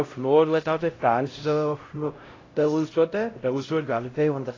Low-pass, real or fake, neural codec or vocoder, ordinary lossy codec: 7.2 kHz; fake; codec, 16 kHz, 0.5 kbps, X-Codec, HuBERT features, trained on LibriSpeech; AAC, 32 kbps